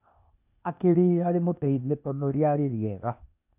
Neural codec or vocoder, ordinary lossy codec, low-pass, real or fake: codec, 16 kHz, 0.8 kbps, ZipCodec; none; 3.6 kHz; fake